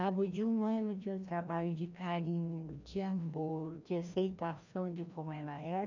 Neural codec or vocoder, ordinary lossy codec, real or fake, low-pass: codec, 16 kHz, 1 kbps, FreqCodec, larger model; none; fake; 7.2 kHz